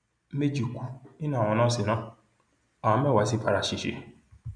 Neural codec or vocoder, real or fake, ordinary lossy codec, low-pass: none; real; none; 9.9 kHz